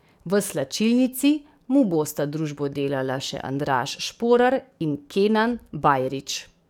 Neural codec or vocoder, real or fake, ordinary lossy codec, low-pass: codec, 44.1 kHz, 7.8 kbps, DAC; fake; none; 19.8 kHz